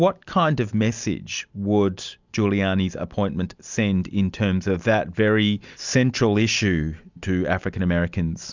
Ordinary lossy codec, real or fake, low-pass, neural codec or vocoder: Opus, 64 kbps; real; 7.2 kHz; none